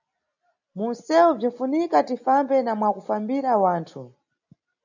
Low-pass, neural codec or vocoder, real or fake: 7.2 kHz; none; real